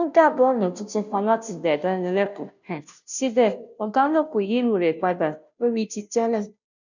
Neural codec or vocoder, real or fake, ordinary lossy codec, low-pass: codec, 16 kHz, 0.5 kbps, FunCodec, trained on Chinese and English, 25 frames a second; fake; none; 7.2 kHz